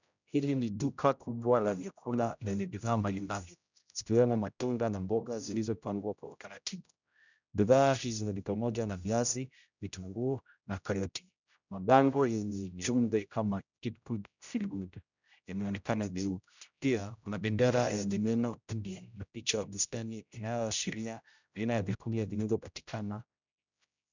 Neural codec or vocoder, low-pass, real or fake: codec, 16 kHz, 0.5 kbps, X-Codec, HuBERT features, trained on general audio; 7.2 kHz; fake